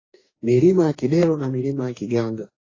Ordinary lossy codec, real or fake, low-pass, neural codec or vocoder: AAC, 32 kbps; fake; 7.2 kHz; codec, 44.1 kHz, 2.6 kbps, DAC